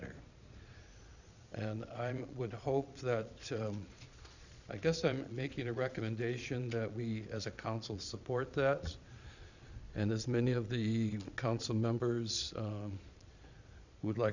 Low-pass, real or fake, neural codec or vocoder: 7.2 kHz; fake; vocoder, 22.05 kHz, 80 mel bands, Vocos